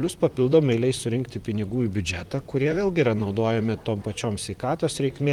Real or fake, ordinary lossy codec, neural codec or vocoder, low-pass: fake; Opus, 32 kbps; vocoder, 44.1 kHz, 128 mel bands, Pupu-Vocoder; 19.8 kHz